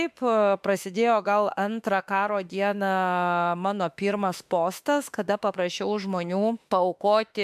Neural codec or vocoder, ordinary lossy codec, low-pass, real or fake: autoencoder, 48 kHz, 32 numbers a frame, DAC-VAE, trained on Japanese speech; MP3, 96 kbps; 14.4 kHz; fake